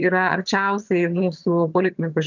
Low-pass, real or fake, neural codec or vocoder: 7.2 kHz; fake; vocoder, 22.05 kHz, 80 mel bands, HiFi-GAN